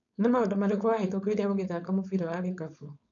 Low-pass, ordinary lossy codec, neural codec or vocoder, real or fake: 7.2 kHz; none; codec, 16 kHz, 4.8 kbps, FACodec; fake